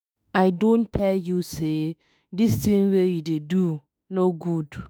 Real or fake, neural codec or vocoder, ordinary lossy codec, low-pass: fake; autoencoder, 48 kHz, 32 numbers a frame, DAC-VAE, trained on Japanese speech; none; none